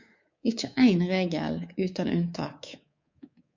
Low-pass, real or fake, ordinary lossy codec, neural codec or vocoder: 7.2 kHz; fake; MP3, 64 kbps; codec, 24 kHz, 3.1 kbps, DualCodec